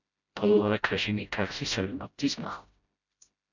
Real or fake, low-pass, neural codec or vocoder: fake; 7.2 kHz; codec, 16 kHz, 0.5 kbps, FreqCodec, smaller model